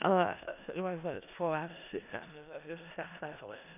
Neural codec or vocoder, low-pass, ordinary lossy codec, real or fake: codec, 16 kHz in and 24 kHz out, 0.4 kbps, LongCat-Audio-Codec, four codebook decoder; 3.6 kHz; none; fake